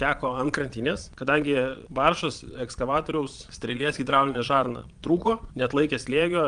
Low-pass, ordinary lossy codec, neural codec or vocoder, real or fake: 9.9 kHz; Opus, 32 kbps; vocoder, 22.05 kHz, 80 mel bands, Vocos; fake